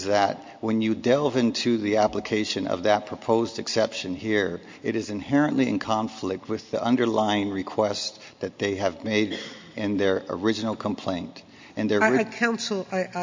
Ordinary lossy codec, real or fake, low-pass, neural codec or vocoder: MP3, 48 kbps; real; 7.2 kHz; none